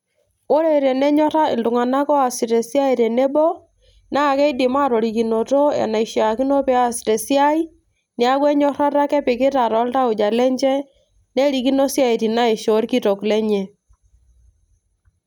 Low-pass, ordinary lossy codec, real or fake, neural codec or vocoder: 19.8 kHz; none; real; none